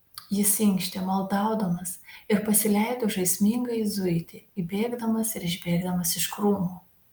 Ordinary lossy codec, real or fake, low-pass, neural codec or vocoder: Opus, 32 kbps; real; 19.8 kHz; none